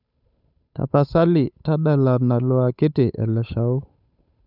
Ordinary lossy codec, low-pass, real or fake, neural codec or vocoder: none; 5.4 kHz; fake; codec, 16 kHz, 8 kbps, FunCodec, trained on Chinese and English, 25 frames a second